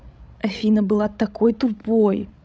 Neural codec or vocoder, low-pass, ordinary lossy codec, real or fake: codec, 16 kHz, 16 kbps, FreqCodec, larger model; none; none; fake